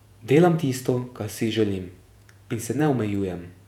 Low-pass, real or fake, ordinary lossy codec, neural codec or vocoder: 19.8 kHz; fake; none; vocoder, 48 kHz, 128 mel bands, Vocos